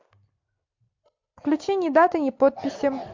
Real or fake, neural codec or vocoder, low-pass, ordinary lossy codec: real; none; 7.2 kHz; MP3, 64 kbps